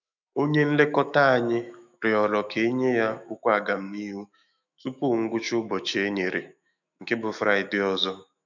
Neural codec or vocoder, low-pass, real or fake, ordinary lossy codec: autoencoder, 48 kHz, 128 numbers a frame, DAC-VAE, trained on Japanese speech; 7.2 kHz; fake; none